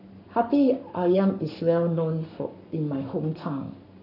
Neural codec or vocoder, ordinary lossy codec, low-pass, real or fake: codec, 44.1 kHz, 7.8 kbps, Pupu-Codec; none; 5.4 kHz; fake